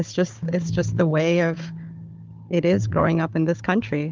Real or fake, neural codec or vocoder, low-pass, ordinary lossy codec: fake; codec, 16 kHz, 4 kbps, FunCodec, trained on Chinese and English, 50 frames a second; 7.2 kHz; Opus, 32 kbps